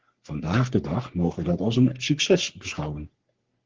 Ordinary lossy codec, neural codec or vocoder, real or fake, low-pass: Opus, 16 kbps; codec, 44.1 kHz, 3.4 kbps, Pupu-Codec; fake; 7.2 kHz